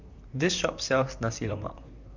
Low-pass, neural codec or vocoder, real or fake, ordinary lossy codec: 7.2 kHz; vocoder, 44.1 kHz, 128 mel bands, Pupu-Vocoder; fake; none